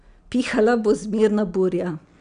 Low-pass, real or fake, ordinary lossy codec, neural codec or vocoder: 9.9 kHz; real; none; none